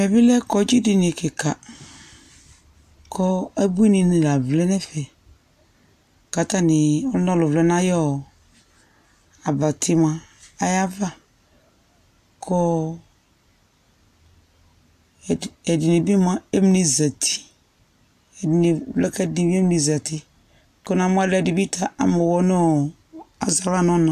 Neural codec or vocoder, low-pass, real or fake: none; 14.4 kHz; real